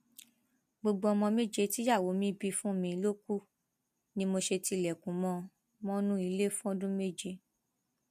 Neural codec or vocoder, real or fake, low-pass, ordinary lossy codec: none; real; 14.4 kHz; MP3, 64 kbps